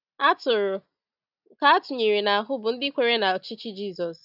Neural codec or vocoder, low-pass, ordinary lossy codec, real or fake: none; 5.4 kHz; none; real